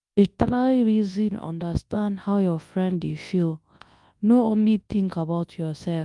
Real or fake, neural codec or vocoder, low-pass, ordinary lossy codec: fake; codec, 24 kHz, 0.9 kbps, WavTokenizer, large speech release; 10.8 kHz; Opus, 32 kbps